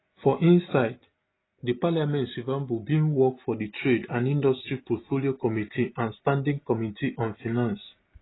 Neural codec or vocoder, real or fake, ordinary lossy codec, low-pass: none; real; AAC, 16 kbps; 7.2 kHz